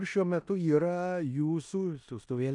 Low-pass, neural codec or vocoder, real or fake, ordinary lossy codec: 10.8 kHz; codec, 16 kHz in and 24 kHz out, 0.9 kbps, LongCat-Audio-Codec, four codebook decoder; fake; MP3, 96 kbps